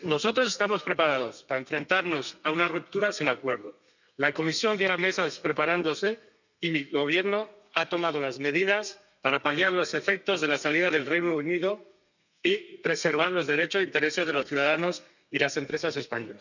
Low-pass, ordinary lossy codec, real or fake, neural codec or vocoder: 7.2 kHz; none; fake; codec, 32 kHz, 1.9 kbps, SNAC